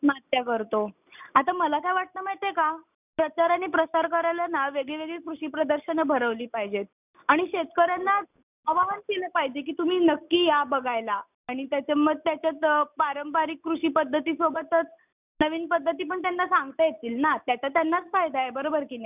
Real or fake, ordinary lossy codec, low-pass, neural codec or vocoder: real; none; 3.6 kHz; none